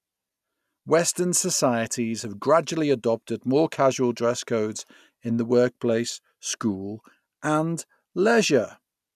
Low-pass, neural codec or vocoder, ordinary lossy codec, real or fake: 14.4 kHz; none; none; real